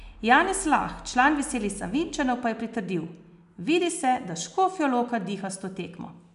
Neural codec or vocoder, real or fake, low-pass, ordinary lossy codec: none; real; 10.8 kHz; none